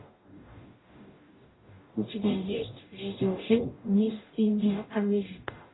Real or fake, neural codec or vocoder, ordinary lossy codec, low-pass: fake; codec, 44.1 kHz, 0.9 kbps, DAC; AAC, 16 kbps; 7.2 kHz